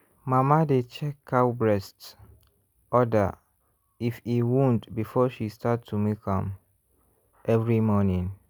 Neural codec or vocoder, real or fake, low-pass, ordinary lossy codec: none; real; none; none